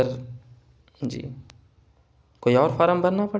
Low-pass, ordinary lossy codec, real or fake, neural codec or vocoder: none; none; real; none